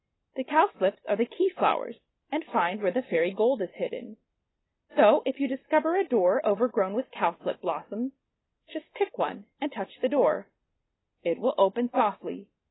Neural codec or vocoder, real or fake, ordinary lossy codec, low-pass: none; real; AAC, 16 kbps; 7.2 kHz